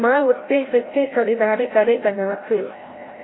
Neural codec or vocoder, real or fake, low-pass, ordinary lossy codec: codec, 16 kHz, 0.5 kbps, FreqCodec, larger model; fake; 7.2 kHz; AAC, 16 kbps